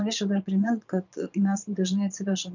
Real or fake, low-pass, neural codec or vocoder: fake; 7.2 kHz; vocoder, 22.05 kHz, 80 mel bands, Vocos